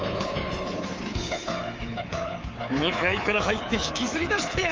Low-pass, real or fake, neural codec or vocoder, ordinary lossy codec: 7.2 kHz; fake; codec, 24 kHz, 3.1 kbps, DualCodec; Opus, 24 kbps